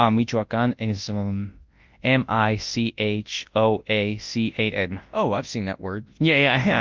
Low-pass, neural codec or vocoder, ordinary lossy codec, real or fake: 7.2 kHz; codec, 24 kHz, 0.9 kbps, WavTokenizer, large speech release; Opus, 32 kbps; fake